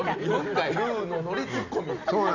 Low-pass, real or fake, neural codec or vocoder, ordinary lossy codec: 7.2 kHz; real; none; none